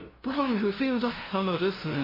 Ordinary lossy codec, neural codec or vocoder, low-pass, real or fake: MP3, 24 kbps; codec, 16 kHz, 0.5 kbps, FunCodec, trained on LibriTTS, 25 frames a second; 5.4 kHz; fake